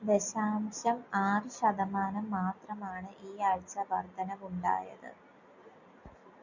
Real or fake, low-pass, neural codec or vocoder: real; 7.2 kHz; none